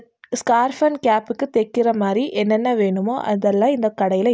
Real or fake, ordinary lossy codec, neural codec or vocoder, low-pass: real; none; none; none